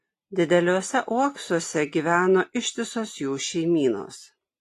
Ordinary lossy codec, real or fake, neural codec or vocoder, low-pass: AAC, 48 kbps; real; none; 14.4 kHz